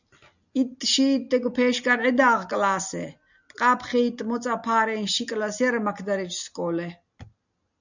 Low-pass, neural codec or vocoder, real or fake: 7.2 kHz; none; real